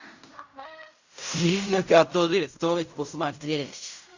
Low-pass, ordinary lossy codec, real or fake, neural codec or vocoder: 7.2 kHz; Opus, 64 kbps; fake; codec, 16 kHz in and 24 kHz out, 0.4 kbps, LongCat-Audio-Codec, fine tuned four codebook decoder